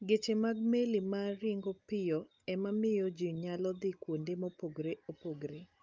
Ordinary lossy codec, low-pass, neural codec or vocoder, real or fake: Opus, 24 kbps; 7.2 kHz; none; real